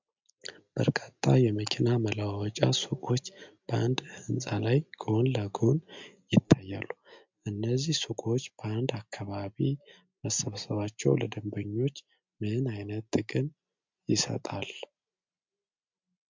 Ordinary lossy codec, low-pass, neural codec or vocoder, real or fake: MP3, 64 kbps; 7.2 kHz; none; real